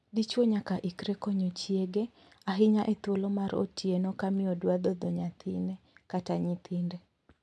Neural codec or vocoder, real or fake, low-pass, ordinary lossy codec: none; real; none; none